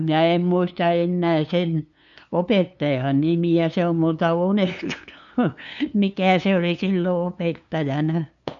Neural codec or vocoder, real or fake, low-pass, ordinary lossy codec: codec, 16 kHz, 2 kbps, FunCodec, trained on LibriTTS, 25 frames a second; fake; 7.2 kHz; none